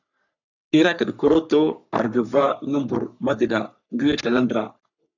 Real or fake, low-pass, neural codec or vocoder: fake; 7.2 kHz; codec, 44.1 kHz, 3.4 kbps, Pupu-Codec